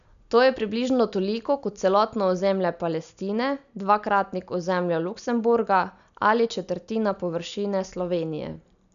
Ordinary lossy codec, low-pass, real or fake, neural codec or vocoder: none; 7.2 kHz; real; none